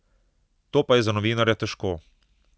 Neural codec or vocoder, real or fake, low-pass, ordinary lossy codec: none; real; none; none